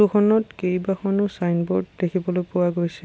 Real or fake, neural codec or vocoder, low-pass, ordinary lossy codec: real; none; none; none